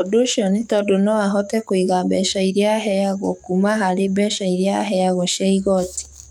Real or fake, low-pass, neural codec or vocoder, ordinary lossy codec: fake; 19.8 kHz; codec, 44.1 kHz, 7.8 kbps, DAC; none